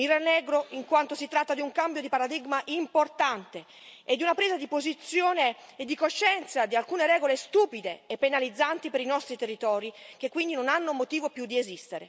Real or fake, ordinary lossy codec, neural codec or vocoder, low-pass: real; none; none; none